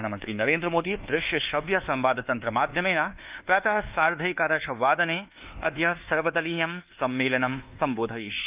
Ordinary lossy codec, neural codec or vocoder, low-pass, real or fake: Opus, 24 kbps; codec, 24 kHz, 1.2 kbps, DualCodec; 3.6 kHz; fake